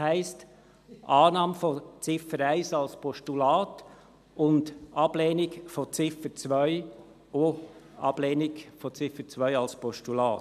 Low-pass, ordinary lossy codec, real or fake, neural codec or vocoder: 14.4 kHz; none; real; none